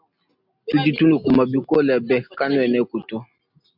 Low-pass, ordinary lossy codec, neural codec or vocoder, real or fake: 5.4 kHz; MP3, 48 kbps; none; real